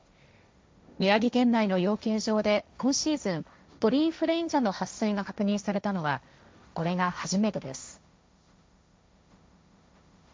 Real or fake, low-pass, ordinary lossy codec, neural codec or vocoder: fake; none; none; codec, 16 kHz, 1.1 kbps, Voila-Tokenizer